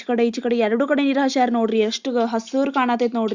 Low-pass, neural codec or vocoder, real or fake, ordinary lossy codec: 7.2 kHz; none; real; Opus, 64 kbps